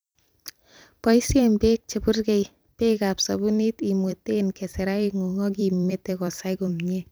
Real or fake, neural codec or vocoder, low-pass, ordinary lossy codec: fake; vocoder, 44.1 kHz, 128 mel bands every 256 samples, BigVGAN v2; none; none